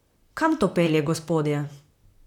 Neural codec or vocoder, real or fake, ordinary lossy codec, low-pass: vocoder, 44.1 kHz, 128 mel bands, Pupu-Vocoder; fake; none; 19.8 kHz